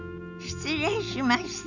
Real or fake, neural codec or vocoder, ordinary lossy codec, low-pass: real; none; none; 7.2 kHz